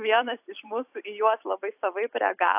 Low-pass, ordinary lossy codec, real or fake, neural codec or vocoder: 3.6 kHz; AAC, 32 kbps; fake; vocoder, 44.1 kHz, 128 mel bands every 256 samples, BigVGAN v2